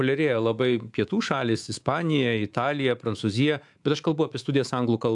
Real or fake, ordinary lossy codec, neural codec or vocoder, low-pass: fake; AAC, 64 kbps; codec, 24 kHz, 3.1 kbps, DualCodec; 10.8 kHz